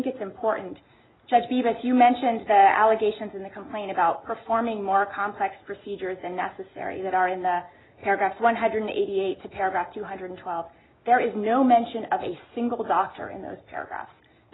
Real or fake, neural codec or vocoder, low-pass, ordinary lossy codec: real; none; 7.2 kHz; AAC, 16 kbps